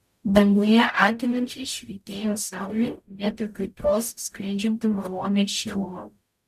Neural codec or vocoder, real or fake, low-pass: codec, 44.1 kHz, 0.9 kbps, DAC; fake; 14.4 kHz